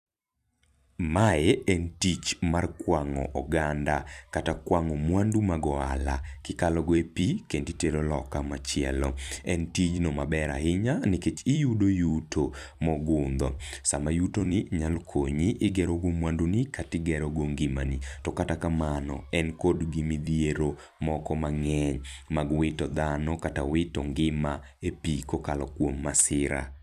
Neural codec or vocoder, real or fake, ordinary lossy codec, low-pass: vocoder, 44.1 kHz, 128 mel bands every 256 samples, BigVGAN v2; fake; none; 14.4 kHz